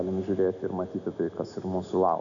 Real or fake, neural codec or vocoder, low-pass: real; none; 7.2 kHz